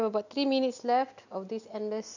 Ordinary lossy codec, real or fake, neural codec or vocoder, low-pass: none; real; none; 7.2 kHz